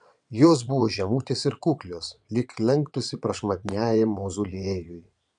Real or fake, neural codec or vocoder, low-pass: fake; vocoder, 22.05 kHz, 80 mel bands, Vocos; 9.9 kHz